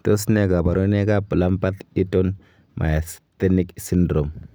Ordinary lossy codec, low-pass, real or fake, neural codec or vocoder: none; none; real; none